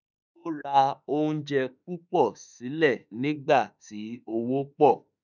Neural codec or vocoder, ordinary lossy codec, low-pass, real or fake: autoencoder, 48 kHz, 32 numbers a frame, DAC-VAE, trained on Japanese speech; none; 7.2 kHz; fake